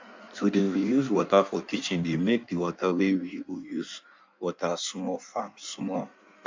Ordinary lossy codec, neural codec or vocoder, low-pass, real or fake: MP3, 64 kbps; codec, 16 kHz in and 24 kHz out, 1.1 kbps, FireRedTTS-2 codec; 7.2 kHz; fake